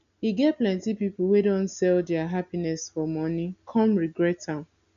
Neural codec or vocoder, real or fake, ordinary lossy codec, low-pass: none; real; none; 7.2 kHz